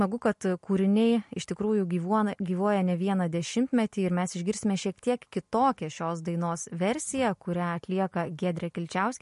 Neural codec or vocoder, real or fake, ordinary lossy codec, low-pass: none; real; MP3, 48 kbps; 14.4 kHz